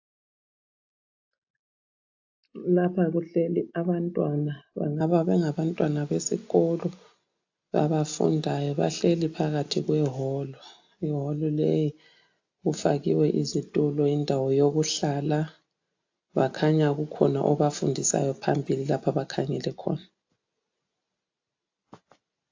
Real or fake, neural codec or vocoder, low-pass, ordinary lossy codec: real; none; 7.2 kHz; AAC, 48 kbps